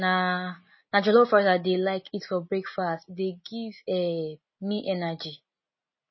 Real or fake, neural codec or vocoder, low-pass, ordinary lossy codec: real; none; 7.2 kHz; MP3, 24 kbps